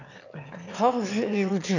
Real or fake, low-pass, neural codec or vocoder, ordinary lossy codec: fake; 7.2 kHz; autoencoder, 22.05 kHz, a latent of 192 numbers a frame, VITS, trained on one speaker; none